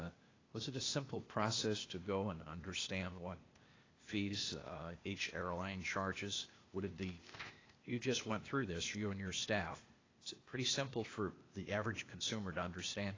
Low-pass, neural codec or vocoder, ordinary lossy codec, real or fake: 7.2 kHz; codec, 16 kHz, 0.8 kbps, ZipCodec; AAC, 32 kbps; fake